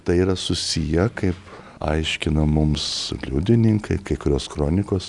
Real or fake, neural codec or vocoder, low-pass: real; none; 10.8 kHz